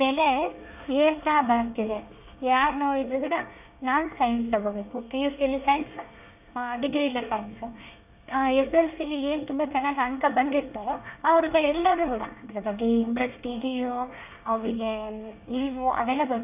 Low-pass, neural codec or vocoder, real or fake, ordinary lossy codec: 3.6 kHz; codec, 24 kHz, 1 kbps, SNAC; fake; none